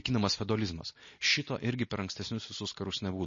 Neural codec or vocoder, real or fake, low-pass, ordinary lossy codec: none; real; 7.2 kHz; MP3, 32 kbps